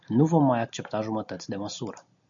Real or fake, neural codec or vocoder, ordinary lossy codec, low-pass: real; none; MP3, 64 kbps; 7.2 kHz